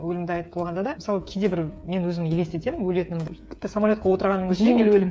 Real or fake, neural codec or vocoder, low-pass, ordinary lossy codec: fake; codec, 16 kHz, 8 kbps, FreqCodec, smaller model; none; none